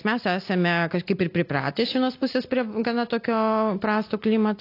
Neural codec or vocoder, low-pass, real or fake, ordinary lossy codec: none; 5.4 kHz; real; AAC, 32 kbps